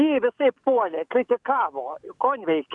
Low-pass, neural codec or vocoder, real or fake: 10.8 kHz; codec, 44.1 kHz, 7.8 kbps, DAC; fake